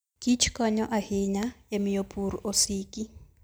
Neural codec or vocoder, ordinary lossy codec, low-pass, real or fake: none; none; none; real